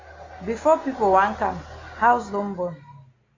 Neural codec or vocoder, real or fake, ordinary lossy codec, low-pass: none; real; AAC, 32 kbps; 7.2 kHz